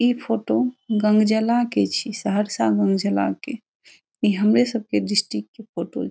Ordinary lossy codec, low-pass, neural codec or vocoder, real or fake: none; none; none; real